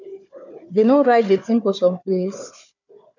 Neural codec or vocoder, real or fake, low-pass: codec, 16 kHz, 4 kbps, FunCodec, trained on Chinese and English, 50 frames a second; fake; 7.2 kHz